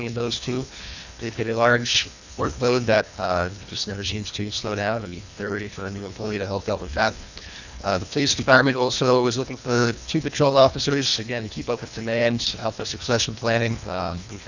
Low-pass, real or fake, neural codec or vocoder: 7.2 kHz; fake; codec, 24 kHz, 1.5 kbps, HILCodec